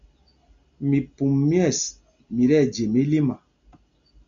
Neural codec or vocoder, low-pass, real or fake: none; 7.2 kHz; real